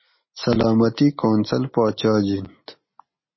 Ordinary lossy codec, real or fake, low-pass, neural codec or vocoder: MP3, 24 kbps; real; 7.2 kHz; none